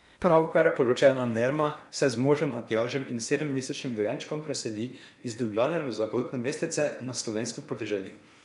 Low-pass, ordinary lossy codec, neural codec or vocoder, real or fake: 10.8 kHz; none; codec, 16 kHz in and 24 kHz out, 0.8 kbps, FocalCodec, streaming, 65536 codes; fake